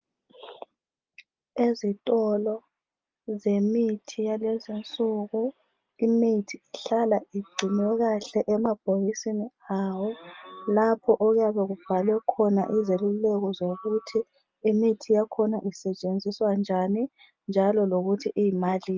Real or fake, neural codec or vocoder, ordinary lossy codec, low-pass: real; none; Opus, 24 kbps; 7.2 kHz